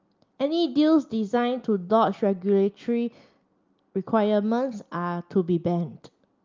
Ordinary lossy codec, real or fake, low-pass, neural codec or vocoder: Opus, 24 kbps; real; 7.2 kHz; none